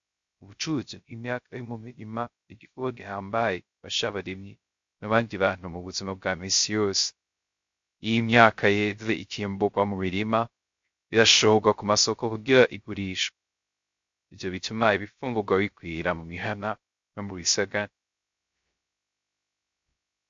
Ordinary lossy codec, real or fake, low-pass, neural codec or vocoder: MP3, 48 kbps; fake; 7.2 kHz; codec, 16 kHz, 0.3 kbps, FocalCodec